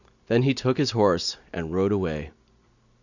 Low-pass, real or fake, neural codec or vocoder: 7.2 kHz; real; none